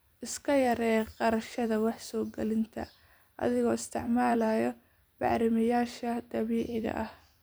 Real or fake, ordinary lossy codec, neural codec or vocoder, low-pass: fake; none; vocoder, 44.1 kHz, 128 mel bands every 256 samples, BigVGAN v2; none